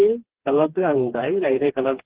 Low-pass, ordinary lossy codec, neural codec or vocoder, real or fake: 3.6 kHz; Opus, 16 kbps; codec, 16 kHz, 2 kbps, FreqCodec, smaller model; fake